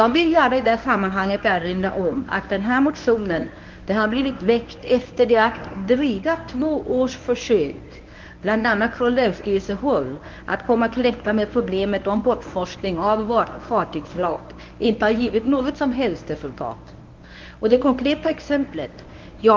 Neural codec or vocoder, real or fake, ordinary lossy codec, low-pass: codec, 24 kHz, 0.9 kbps, WavTokenizer, medium speech release version 1; fake; Opus, 32 kbps; 7.2 kHz